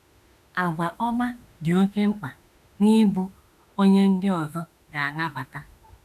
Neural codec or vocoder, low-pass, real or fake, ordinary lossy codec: autoencoder, 48 kHz, 32 numbers a frame, DAC-VAE, trained on Japanese speech; 14.4 kHz; fake; none